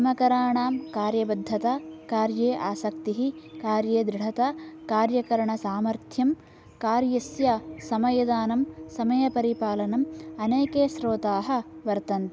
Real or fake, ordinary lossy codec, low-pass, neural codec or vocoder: real; none; none; none